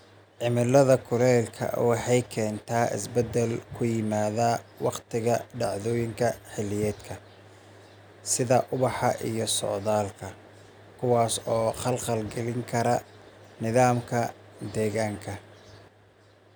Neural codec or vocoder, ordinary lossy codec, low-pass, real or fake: none; none; none; real